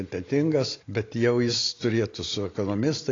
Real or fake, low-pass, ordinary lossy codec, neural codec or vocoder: real; 7.2 kHz; AAC, 32 kbps; none